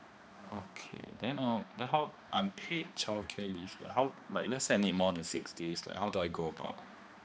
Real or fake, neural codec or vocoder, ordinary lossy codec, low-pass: fake; codec, 16 kHz, 2 kbps, X-Codec, HuBERT features, trained on balanced general audio; none; none